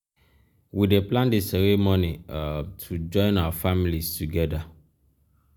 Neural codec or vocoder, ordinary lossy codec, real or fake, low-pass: none; none; real; none